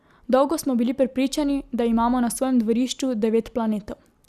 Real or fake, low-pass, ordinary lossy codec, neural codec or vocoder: real; 14.4 kHz; Opus, 64 kbps; none